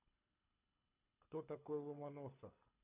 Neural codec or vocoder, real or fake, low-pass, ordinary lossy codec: codec, 24 kHz, 6 kbps, HILCodec; fake; 3.6 kHz; none